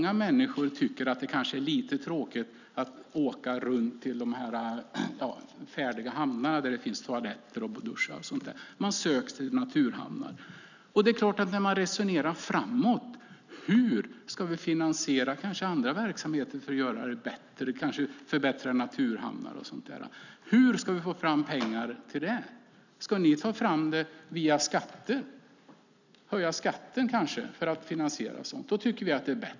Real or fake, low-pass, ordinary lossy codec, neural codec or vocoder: real; 7.2 kHz; none; none